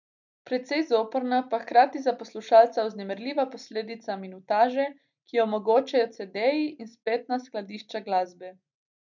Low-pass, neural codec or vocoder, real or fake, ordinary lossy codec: 7.2 kHz; none; real; none